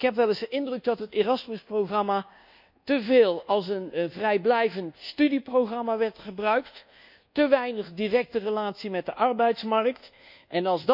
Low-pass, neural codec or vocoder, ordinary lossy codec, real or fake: 5.4 kHz; codec, 24 kHz, 1.2 kbps, DualCodec; none; fake